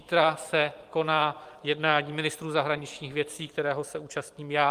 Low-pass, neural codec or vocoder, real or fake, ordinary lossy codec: 14.4 kHz; vocoder, 44.1 kHz, 128 mel bands every 512 samples, BigVGAN v2; fake; Opus, 32 kbps